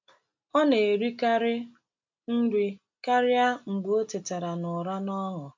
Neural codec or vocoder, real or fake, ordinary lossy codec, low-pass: none; real; MP3, 64 kbps; 7.2 kHz